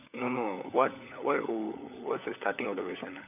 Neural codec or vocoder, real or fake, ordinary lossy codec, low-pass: codec, 16 kHz, 16 kbps, FunCodec, trained on LibriTTS, 50 frames a second; fake; none; 3.6 kHz